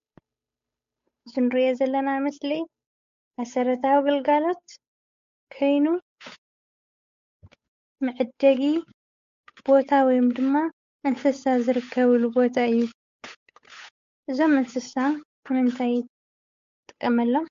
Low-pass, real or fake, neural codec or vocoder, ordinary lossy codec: 7.2 kHz; fake; codec, 16 kHz, 8 kbps, FunCodec, trained on Chinese and English, 25 frames a second; MP3, 48 kbps